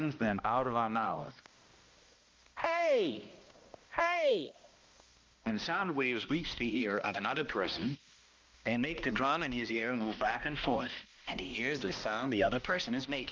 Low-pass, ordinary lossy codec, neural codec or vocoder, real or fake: 7.2 kHz; Opus, 24 kbps; codec, 16 kHz, 1 kbps, X-Codec, HuBERT features, trained on balanced general audio; fake